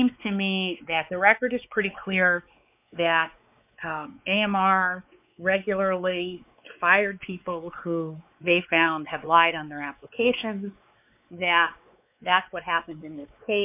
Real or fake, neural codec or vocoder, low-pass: fake; codec, 16 kHz, 4 kbps, X-Codec, WavLM features, trained on Multilingual LibriSpeech; 3.6 kHz